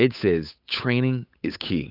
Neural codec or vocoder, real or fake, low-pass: codec, 16 kHz, 8 kbps, FunCodec, trained on Chinese and English, 25 frames a second; fake; 5.4 kHz